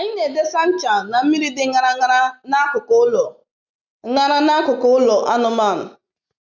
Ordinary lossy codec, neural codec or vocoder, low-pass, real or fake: none; none; 7.2 kHz; real